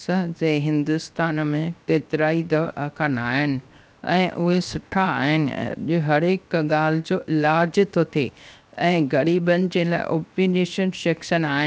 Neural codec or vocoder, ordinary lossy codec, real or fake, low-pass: codec, 16 kHz, 0.7 kbps, FocalCodec; none; fake; none